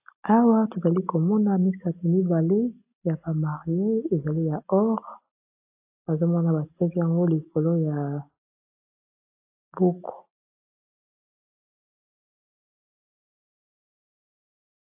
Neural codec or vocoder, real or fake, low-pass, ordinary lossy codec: none; real; 3.6 kHz; AAC, 32 kbps